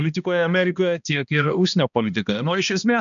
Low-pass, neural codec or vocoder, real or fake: 7.2 kHz; codec, 16 kHz, 1 kbps, X-Codec, HuBERT features, trained on balanced general audio; fake